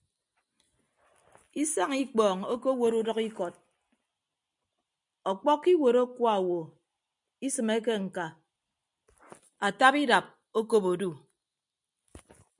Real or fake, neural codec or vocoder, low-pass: real; none; 10.8 kHz